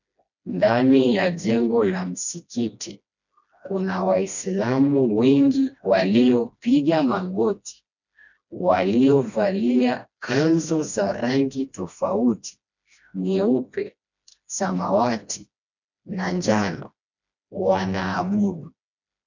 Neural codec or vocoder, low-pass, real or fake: codec, 16 kHz, 1 kbps, FreqCodec, smaller model; 7.2 kHz; fake